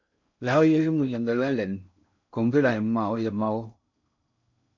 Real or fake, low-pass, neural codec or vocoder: fake; 7.2 kHz; codec, 16 kHz in and 24 kHz out, 0.6 kbps, FocalCodec, streaming, 4096 codes